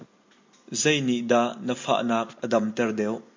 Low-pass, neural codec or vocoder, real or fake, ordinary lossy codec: 7.2 kHz; none; real; MP3, 48 kbps